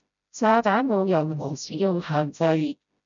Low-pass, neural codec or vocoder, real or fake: 7.2 kHz; codec, 16 kHz, 0.5 kbps, FreqCodec, smaller model; fake